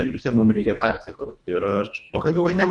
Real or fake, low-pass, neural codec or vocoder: fake; 10.8 kHz; codec, 24 kHz, 1.5 kbps, HILCodec